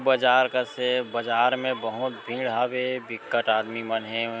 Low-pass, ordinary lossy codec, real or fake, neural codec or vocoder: none; none; real; none